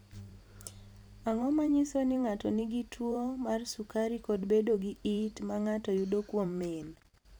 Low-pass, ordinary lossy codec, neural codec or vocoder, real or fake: none; none; vocoder, 44.1 kHz, 128 mel bands every 512 samples, BigVGAN v2; fake